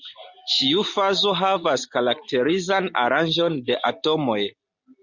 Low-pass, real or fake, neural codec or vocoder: 7.2 kHz; real; none